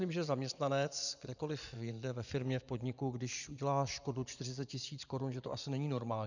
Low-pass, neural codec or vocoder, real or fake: 7.2 kHz; vocoder, 24 kHz, 100 mel bands, Vocos; fake